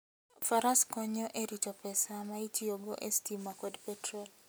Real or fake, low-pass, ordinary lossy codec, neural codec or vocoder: real; none; none; none